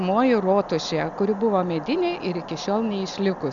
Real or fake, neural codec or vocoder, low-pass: real; none; 7.2 kHz